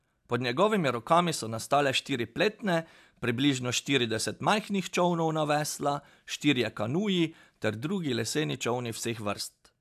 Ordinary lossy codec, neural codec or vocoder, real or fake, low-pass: AAC, 96 kbps; none; real; 14.4 kHz